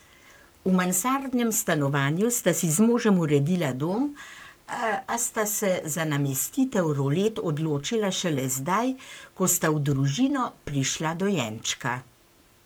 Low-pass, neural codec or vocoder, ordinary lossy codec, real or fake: none; codec, 44.1 kHz, 7.8 kbps, Pupu-Codec; none; fake